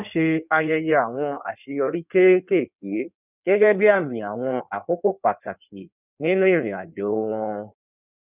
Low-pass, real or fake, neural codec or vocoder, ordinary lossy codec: 3.6 kHz; fake; codec, 16 kHz in and 24 kHz out, 1.1 kbps, FireRedTTS-2 codec; none